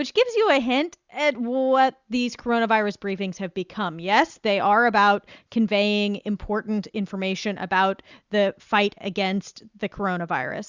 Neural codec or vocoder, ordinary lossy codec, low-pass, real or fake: none; Opus, 64 kbps; 7.2 kHz; real